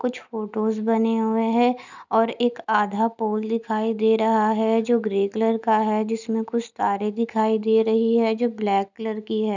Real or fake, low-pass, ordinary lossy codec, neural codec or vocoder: real; 7.2 kHz; none; none